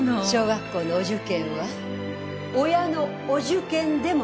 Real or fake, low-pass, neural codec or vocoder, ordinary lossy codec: real; none; none; none